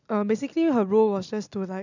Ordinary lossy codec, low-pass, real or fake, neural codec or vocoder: none; 7.2 kHz; real; none